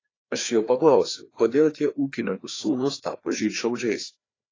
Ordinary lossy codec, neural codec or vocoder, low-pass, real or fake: AAC, 32 kbps; codec, 16 kHz, 2 kbps, FreqCodec, larger model; 7.2 kHz; fake